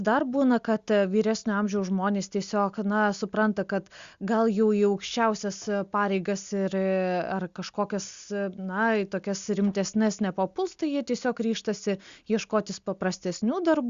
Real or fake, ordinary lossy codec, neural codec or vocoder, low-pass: real; Opus, 64 kbps; none; 7.2 kHz